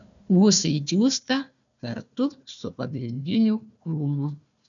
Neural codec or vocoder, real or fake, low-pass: codec, 16 kHz, 1 kbps, FunCodec, trained on Chinese and English, 50 frames a second; fake; 7.2 kHz